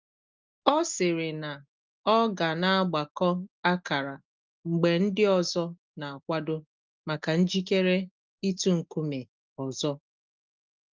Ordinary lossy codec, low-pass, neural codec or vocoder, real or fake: Opus, 24 kbps; 7.2 kHz; none; real